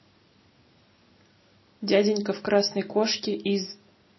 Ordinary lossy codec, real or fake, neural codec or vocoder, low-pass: MP3, 24 kbps; real; none; 7.2 kHz